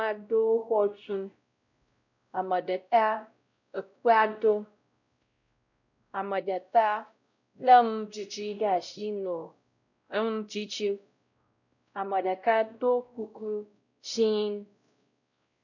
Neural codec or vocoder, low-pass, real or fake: codec, 16 kHz, 0.5 kbps, X-Codec, WavLM features, trained on Multilingual LibriSpeech; 7.2 kHz; fake